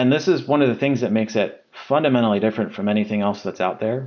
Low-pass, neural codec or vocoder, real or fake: 7.2 kHz; none; real